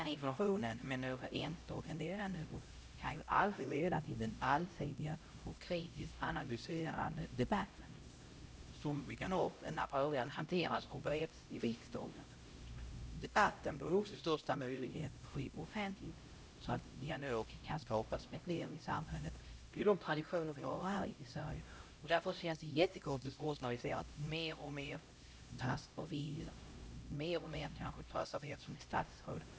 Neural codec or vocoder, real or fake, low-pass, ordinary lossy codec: codec, 16 kHz, 0.5 kbps, X-Codec, HuBERT features, trained on LibriSpeech; fake; none; none